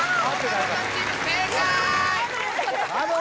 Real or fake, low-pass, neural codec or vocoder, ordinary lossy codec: real; none; none; none